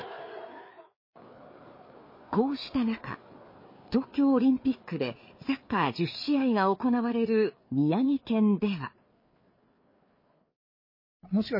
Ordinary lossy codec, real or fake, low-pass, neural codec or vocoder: MP3, 24 kbps; fake; 5.4 kHz; codec, 16 kHz, 4 kbps, FreqCodec, larger model